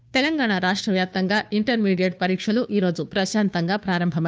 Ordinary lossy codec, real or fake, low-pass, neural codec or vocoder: none; fake; none; codec, 16 kHz, 2 kbps, FunCodec, trained on Chinese and English, 25 frames a second